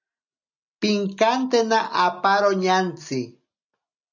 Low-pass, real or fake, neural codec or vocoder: 7.2 kHz; real; none